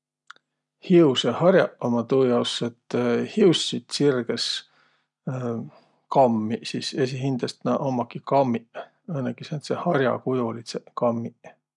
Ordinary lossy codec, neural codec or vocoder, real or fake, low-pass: none; none; real; 10.8 kHz